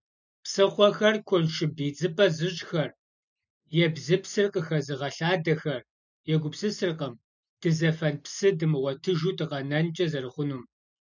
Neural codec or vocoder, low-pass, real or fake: none; 7.2 kHz; real